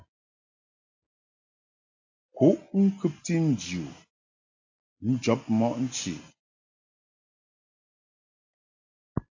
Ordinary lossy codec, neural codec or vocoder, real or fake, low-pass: AAC, 48 kbps; none; real; 7.2 kHz